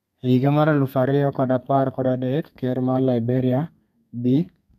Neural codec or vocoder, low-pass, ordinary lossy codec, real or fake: codec, 32 kHz, 1.9 kbps, SNAC; 14.4 kHz; none; fake